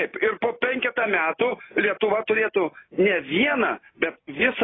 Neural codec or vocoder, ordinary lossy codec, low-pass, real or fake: none; AAC, 16 kbps; 7.2 kHz; real